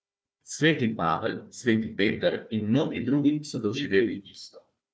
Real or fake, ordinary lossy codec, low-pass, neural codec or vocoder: fake; none; none; codec, 16 kHz, 1 kbps, FunCodec, trained on Chinese and English, 50 frames a second